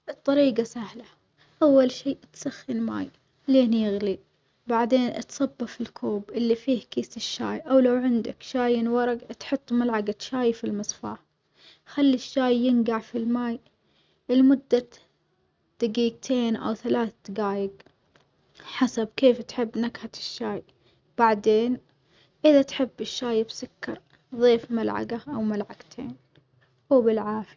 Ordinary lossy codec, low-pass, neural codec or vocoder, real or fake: none; none; none; real